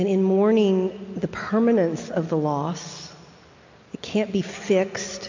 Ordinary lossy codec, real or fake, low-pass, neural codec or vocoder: AAC, 48 kbps; real; 7.2 kHz; none